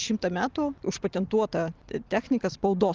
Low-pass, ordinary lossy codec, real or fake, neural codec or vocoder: 7.2 kHz; Opus, 24 kbps; real; none